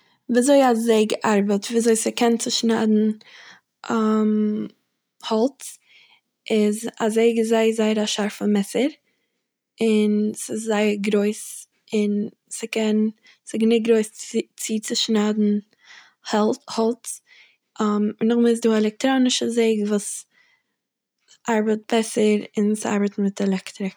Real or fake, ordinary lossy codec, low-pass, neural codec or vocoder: real; none; none; none